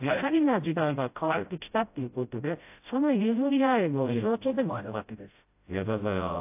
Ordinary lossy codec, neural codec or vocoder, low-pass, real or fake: AAC, 32 kbps; codec, 16 kHz, 0.5 kbps, FreqCodec, smaller model; 3.6 kHz; fake